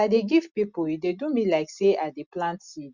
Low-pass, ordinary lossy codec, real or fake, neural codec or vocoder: 7.2 kHz; Opus, 64 kbps; real; none